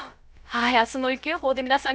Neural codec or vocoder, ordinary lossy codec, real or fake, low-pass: codec, 16 kHz, about 1 kbps, DyCAST, with the encoder's durations; none; fake; none